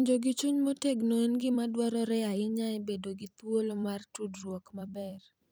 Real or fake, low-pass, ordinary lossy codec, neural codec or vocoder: fake; none; none; vocoder, 44.1 kHz, 128 mel bands every 256 samples, BigVGAN v2